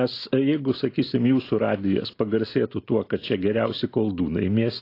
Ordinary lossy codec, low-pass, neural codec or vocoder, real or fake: AAC, 32 kbps; 5.4 kHz; none; real